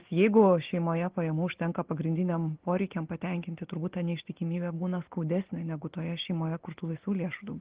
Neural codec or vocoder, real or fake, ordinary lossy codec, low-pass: none; real; Opus, 16 kbps; 3.6 kHz